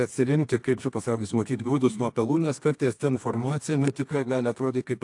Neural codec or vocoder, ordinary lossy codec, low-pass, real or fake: codec, 24 kHz, 0.9 kbps, WavTokenizer, medium music audio release; AAC, 64 kbps; 10.8 kHz; fake